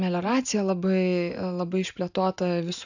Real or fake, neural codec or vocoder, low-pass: real; none; 7.2 kHz